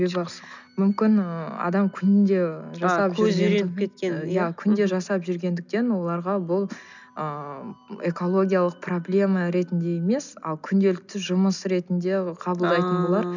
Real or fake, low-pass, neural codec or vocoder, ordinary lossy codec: real; 7.2 kHz; none; none